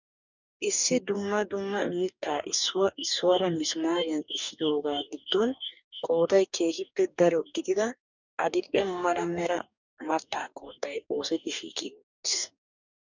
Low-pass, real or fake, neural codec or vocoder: 7.2 kHz; fake; codec, 44.1 kHz, 2.6 kbps, DAC